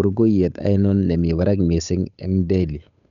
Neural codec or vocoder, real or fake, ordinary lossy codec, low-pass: codec, 16 kHz, 8 kbps, FunCodec, trained on Chinese and English, 25 frames a second; fake; none; 7.2 kHz